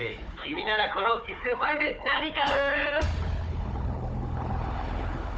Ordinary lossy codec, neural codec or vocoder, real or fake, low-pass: none; codec, 16 kHz, 4 kbps, FunCodec, trained on Chinese and English, 50 frames a second; fake; none